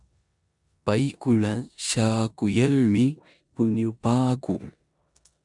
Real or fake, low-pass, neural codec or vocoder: fake; 10.8 kHz; codec, 16 kHz in and 24 kHz out, 0.9 kbps, LongCat-Audio-Codec, four codebook decoder